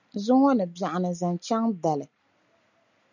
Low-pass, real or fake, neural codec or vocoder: 7.2 kHz; real; none